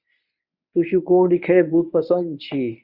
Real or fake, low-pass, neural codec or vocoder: fake; 5.4 kHz; codec, 24 kHz, 0.9 kbps, WavTokenizer, medium speech release version 1